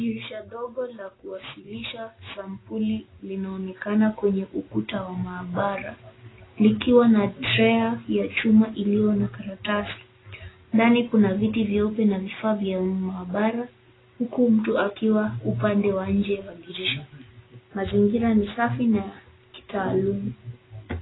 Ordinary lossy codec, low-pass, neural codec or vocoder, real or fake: AAC, 16 kbps; 7.2 kHz; none; real